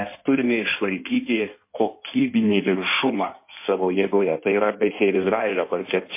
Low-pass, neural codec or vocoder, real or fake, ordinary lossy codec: 3.6 kHz; codec, 16 kHz in and 24 kHz out, 1.1 kbps, FireRedTTS-2 codec; fake; MP3, 24 kbps